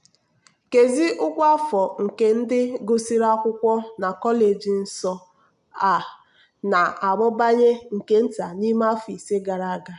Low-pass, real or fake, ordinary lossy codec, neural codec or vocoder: 10.8 kHz; real; none; none